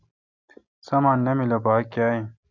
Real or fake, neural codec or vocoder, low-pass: real; none; 7.2 kHz